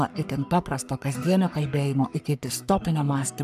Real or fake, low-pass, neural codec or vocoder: fake; 14.4 kHz; codec, 44.1 kHz, 3.4 kbps, Pupu-Codec